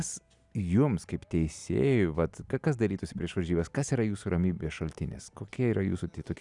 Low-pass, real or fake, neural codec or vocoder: 10.8 kHz; real; none